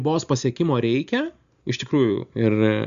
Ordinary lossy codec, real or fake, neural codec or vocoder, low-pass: Opus, 64 kbps; real; none; 7.2 kHz